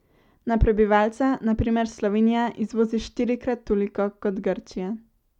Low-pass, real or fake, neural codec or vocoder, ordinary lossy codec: 19.8 kHz; real; none; none